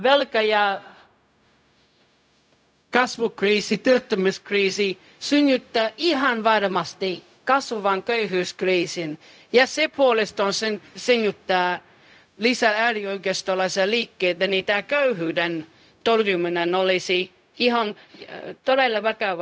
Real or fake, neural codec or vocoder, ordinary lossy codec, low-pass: fake; codec, 16 kHz, 0.4 kbps, LongCat-Audio-Codec; none; none